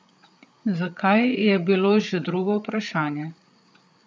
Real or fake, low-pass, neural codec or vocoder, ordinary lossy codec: fake; none; codec, 16 kHz, 8 kbps, FreqCodec, larger model; none